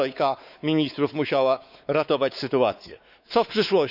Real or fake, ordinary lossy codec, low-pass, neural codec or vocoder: fake; none; 5.4 kHz; codec, 16 kHz, 4 kbps, X-Codec, WavLM features, trained on Multilingual LibriSpeech